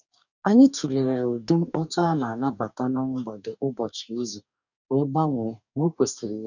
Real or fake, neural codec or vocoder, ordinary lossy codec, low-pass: fake; codec, 44.1 kHz, 2.6 kbps, DAC; none; 7.2 kHz